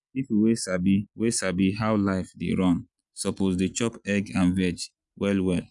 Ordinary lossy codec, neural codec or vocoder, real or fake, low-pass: none; none; real; 10.8 kHz